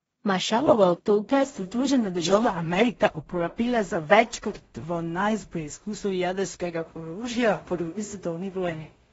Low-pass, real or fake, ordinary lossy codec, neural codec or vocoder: 10.8 kHz; fake; AAC, 24 kbps; codec, 16 kHz in and 24 kHz out, 0.4 kbps, LongCat-Audio-Codec, two codebook decoder